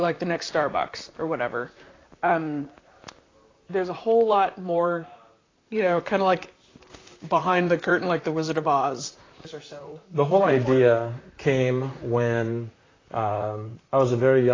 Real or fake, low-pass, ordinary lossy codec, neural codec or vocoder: fake; 7.2 kHz; AAC, 32 kbps; vocoder, 44.1 kHz, 128 mel bands, Pupu-Vocoder